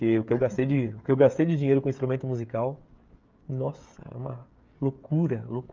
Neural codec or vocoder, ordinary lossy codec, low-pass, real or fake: codec, 16 kHz, 16 kbps, FreqCodec, smaller model; Opus, 24 kbps; 7.2 kHz; fake